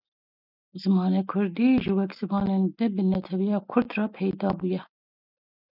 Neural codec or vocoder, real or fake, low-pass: none; real; 5.4 kHz